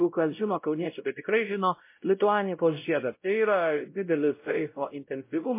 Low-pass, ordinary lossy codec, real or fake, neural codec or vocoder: 3.6 kHz; MP3, 24 kbps; fake; codec, 16 kHz, 0.5 kbps, X-Codec, WavLM features, trained on Multilingual LibriSpeech